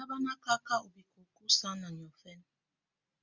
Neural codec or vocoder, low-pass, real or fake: none; 7.2 kHz; real